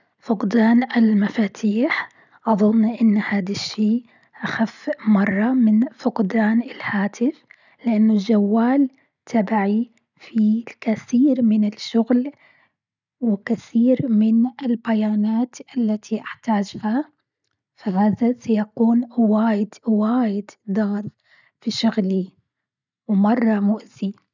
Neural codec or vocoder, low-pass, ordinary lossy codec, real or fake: none; 7.2 kHz; none; real